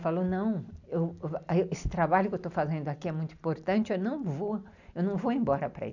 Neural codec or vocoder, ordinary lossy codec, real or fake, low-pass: none; none; real; 7.2 kHz